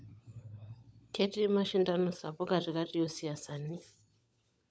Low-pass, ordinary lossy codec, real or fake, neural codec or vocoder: none; none; fake; codec, 16 kHz, 16 kbps, FunCodec, trained on LibriTTS, 50 frames a second